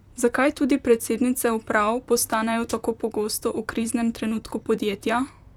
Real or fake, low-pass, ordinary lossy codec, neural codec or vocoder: fake; 19.8 kHz; none; vocoder, 44.1 kHz, 128 mel bands, Pupu-Vocoder